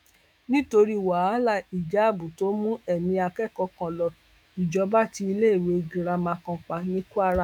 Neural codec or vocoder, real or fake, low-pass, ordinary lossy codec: autoencoder, 48 kHz, 128 numbers a frame, DAC-VAE, trained on Japanese speech; fake; 19.8 kHz; none